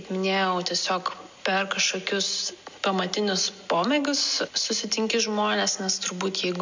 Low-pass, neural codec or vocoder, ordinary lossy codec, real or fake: 7.2 kHz; none; MP3, 64 kbps; real